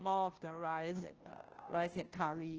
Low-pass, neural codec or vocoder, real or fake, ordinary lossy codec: 7.2 kHz; codec, 16 kHz, 0.5 kbps, FunCodec, trained on Chinese and English, 25 frames a second; fake; Opus, 16 kbps